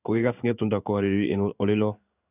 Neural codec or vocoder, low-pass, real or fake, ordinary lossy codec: codec, 16 kHz in and 24 kHz out, 1 kbps, XY-Tokenizer; 3.6 kHz; fake; none